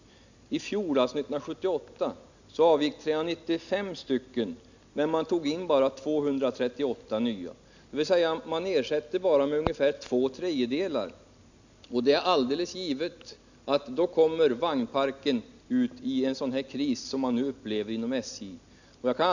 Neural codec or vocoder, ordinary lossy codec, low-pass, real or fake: none; none; 7.2 kHz; real